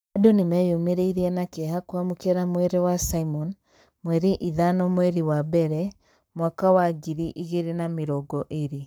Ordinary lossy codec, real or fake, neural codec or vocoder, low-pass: none; fake; codec, 44.1 kHz, 7.8 kbps, Pupu-Codec; none